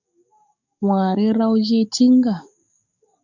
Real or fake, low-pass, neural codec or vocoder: fake; 7.2 kHz; codec, 44.1 kHz, 7.8 kbps, DAC